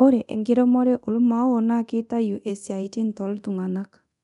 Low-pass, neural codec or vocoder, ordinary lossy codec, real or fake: 10.8 kHz; codec, 24 kHz, 0.9 kbps, DualCodec; none; fake